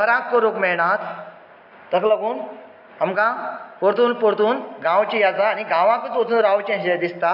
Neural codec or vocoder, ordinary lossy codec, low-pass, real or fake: none; none; 5.4 kHz; real